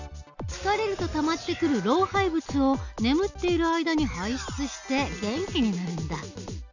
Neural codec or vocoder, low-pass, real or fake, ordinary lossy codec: none; 7.2 kHz; real; none